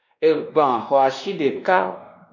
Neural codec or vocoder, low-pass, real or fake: codec, 16 kHz, 1 kbps, X-Codec, WavLM features, trained on Multilingual LibriSpeech; 7.2 kHz; fake